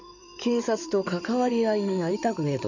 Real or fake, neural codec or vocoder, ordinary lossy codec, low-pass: fake; codec, 16 kHz in and 24 kHz out, 2.2 kbps, FireRedTTS-2 codec; none; 7.2 kHz